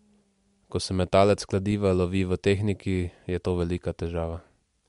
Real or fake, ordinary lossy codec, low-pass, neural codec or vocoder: real; MP3, 64 kbps; 10.8 kHz; none